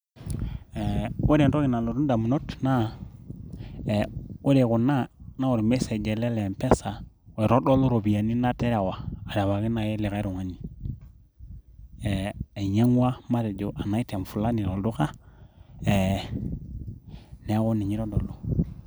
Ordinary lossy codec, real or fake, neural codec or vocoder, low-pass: none; real; none; none